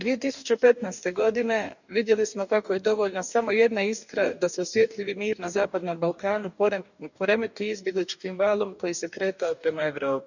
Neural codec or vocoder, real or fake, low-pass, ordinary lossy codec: codec, 44.1 kHz, 2.6 kbps, DAC; fake; 7.2 kHz; none